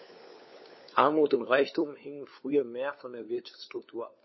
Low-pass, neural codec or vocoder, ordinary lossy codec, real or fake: 7.2 kHz; codec, 16 kHz, 4 kbps, FunCodec, trained on LibriTTS, 50 frames a second; MP3, 24 kbps; fake